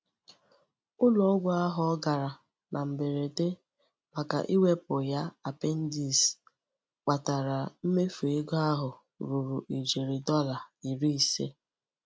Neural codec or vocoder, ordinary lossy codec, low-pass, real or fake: none; none; none; real